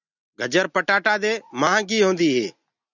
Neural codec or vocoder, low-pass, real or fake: none; 7.2 kHz; real